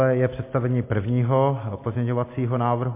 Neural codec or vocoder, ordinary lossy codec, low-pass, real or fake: none; MP3, 24 kbps; 3.6 kHz; real